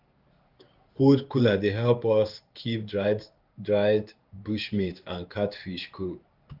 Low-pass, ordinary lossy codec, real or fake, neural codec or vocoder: 5.4 kHz; Opus, 32 kbps; fake; codec, 16 kHz in and 24 kHz out, 1 kbps, XY-Tokenizer